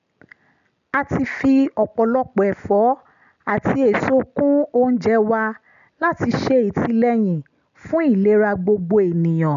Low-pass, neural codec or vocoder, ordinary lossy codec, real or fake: 7.2 kHz; none; none; real